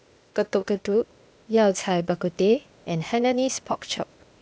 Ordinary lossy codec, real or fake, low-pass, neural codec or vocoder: none; fake; none; codec, 16 kHz, 0.8 kbps, ZipCodec